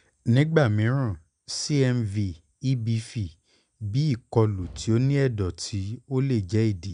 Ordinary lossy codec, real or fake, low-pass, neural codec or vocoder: none; real; 9.9 kHz; none